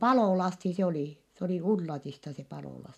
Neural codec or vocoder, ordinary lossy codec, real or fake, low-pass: none; none; real; 14.4 kHz